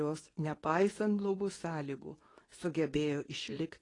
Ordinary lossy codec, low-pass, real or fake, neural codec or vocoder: AAC, 32 kbps; 10.8 kHz; fake; codec, 24 kHz, 0.9 kbps, WavTokenizer, medium speech release version 2